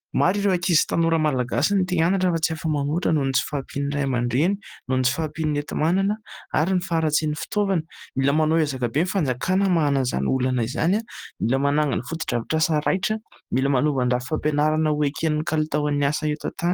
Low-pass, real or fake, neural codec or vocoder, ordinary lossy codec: 19.8 kHz; real; none; Opus, 24 kbps